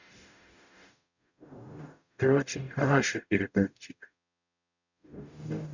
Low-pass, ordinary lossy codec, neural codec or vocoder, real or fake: 7.2 kHz; none; codec, 44.1 kHz, 0.9 kbps, DAC; fake